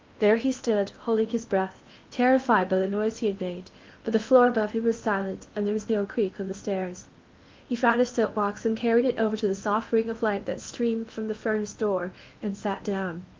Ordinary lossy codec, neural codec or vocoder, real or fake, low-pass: Opus, 24 kbps; codec, 16 kHz in and 24 kHz out, 0.8 kbps, FocalCodec, streaming, 65536 codes; fake; 7.2 kHz